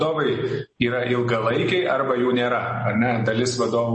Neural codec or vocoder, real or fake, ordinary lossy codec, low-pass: none; real; MP3, 32 kbps; 10.8 kHz